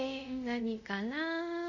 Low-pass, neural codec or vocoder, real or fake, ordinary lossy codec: 7.2 kHz; codec, 24 kHz, 0.5 kbps, DualCodec; fake; none